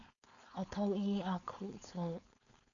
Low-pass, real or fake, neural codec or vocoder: 7.2 kHz; fake; codec, 16 kHz, 4.8 kbps, FACodec